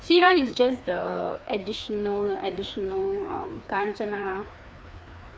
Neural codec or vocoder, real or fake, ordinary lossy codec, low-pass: codec, 16 kHz, 2 kbps, FreqCodec, larger model; fake; none; none